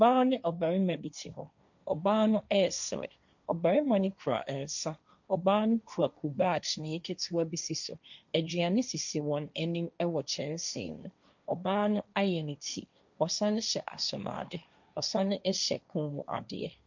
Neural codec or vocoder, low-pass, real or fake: codec, 16 kHz, 1.1 kbps, Voila-Tokenizer; 7.2 kHz; fake